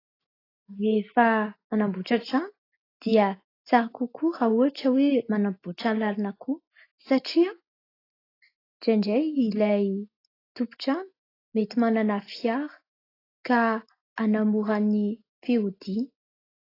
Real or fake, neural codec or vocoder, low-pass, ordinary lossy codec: real; none; 5.4 kHz; AAC, 24 kbps